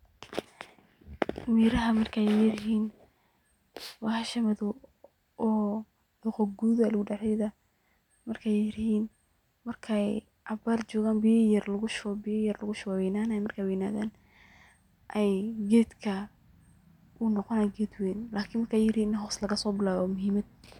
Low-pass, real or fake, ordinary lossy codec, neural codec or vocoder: 19.8 kHz; real; none; none